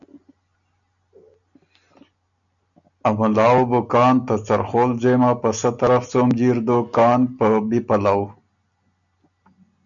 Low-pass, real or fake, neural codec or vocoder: 7.2 kHz; real; none